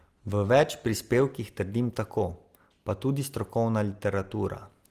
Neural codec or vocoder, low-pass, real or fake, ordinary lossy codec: none; 14.4 kHz; real; Opus, 24 kbps